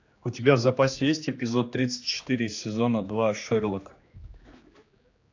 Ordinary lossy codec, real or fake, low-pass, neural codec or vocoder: AAC, 48 kbps; fake; 7.2 kHz; codec, 16 kHz, 2 kbps, X-Codec, HuBERT features, trained on general audio